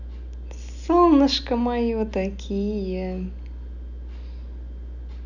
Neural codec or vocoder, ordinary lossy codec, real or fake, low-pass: none; none; real; 7.2 kHz